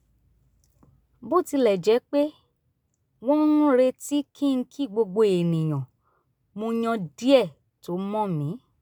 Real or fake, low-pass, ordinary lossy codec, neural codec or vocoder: real; none; none; none